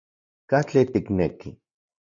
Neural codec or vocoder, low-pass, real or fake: none; 7.2 kHz; real